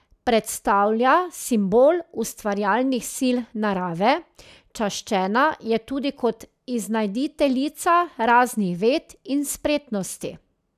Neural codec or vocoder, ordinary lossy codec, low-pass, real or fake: none; none; 14.4 kHz; real